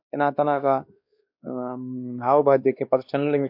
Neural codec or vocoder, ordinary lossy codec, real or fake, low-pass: codec, 16 kHz, 2 kbps, X-Codec, WavLM features, trained on Multilingual LibriSpeech; MP3, 48 kbps; fake; 5.4 kHz